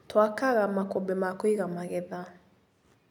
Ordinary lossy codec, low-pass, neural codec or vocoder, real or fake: none; 19.8 kHz; none; real